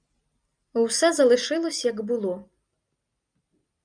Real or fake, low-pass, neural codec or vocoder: real; 9.9 kHz; none